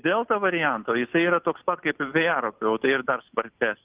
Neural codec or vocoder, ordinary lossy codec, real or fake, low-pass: none; Opus, 32 kbps; real; 3.6 kHz